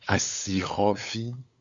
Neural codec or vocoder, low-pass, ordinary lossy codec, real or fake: codec, 16 kHz, 8 kbps, FunCodec, trained on LibriTTS, 25 frames a second; 7.2 kHz; Opus, 64 kbps; fake